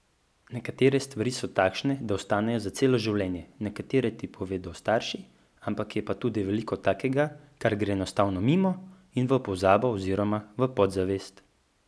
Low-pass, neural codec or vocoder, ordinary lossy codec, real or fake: none; none; none; real